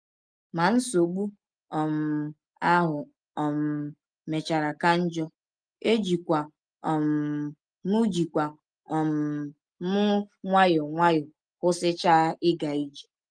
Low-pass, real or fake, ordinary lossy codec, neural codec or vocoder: 9.9 kHz; real; Opus, 32 kbps; none